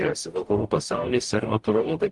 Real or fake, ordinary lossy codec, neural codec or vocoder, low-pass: fake; Opus, 24 kbps; codec, 44.1 kHz, 0.9 kbps, DAC; 10.8 kHz